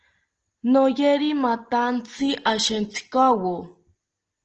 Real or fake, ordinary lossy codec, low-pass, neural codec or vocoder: real; Opus, 16 kbps; 7.2 kHz; none